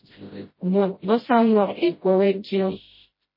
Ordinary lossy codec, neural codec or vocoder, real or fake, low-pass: MP3, 24 kbps; codec, 16 kHz, 0.5 kbps, FreqCodec, smaller model; fake; 5.4 kHz